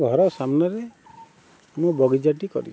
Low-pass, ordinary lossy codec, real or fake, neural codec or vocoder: none; none; real; none